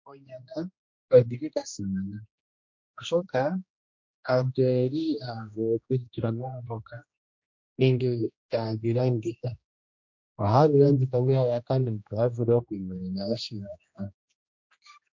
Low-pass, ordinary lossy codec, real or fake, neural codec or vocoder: 7.2 kHz; MP3, 48 kbps; fake; codec, 16 kHz, 1 kbps, X-Codec, HuBERT features, trained on general audio